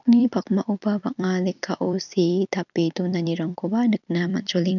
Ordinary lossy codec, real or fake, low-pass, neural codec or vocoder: none; fake; 7.2 kHz; vocoder, 44.1 kHz, 128 mel bands every 256 samples, BigVGAN v2